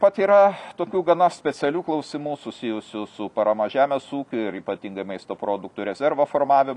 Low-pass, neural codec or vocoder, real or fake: 10.8 kHz; none; real